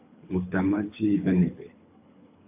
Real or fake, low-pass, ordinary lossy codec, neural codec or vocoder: fake; 3.6 kHz; AAC, 24 kbps; codec, 24 kHz, 6 kbps, HILCodec